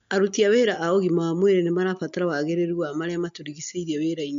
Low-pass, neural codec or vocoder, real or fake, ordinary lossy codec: 7.2 kHz; none; real; MP3, 64 kbps